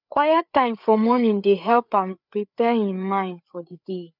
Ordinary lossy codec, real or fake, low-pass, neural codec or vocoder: none; fake; 5.4 kHz; codec, 16 kHz, 4 kbps, FreqCodec, larger model